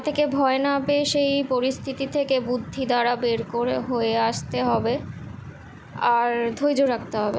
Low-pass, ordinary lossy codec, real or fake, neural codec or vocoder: none; none; real; none